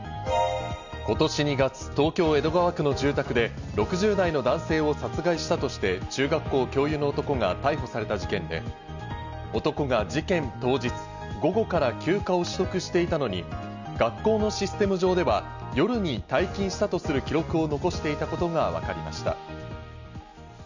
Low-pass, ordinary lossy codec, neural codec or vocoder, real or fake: 7.2 kHz; none; none; real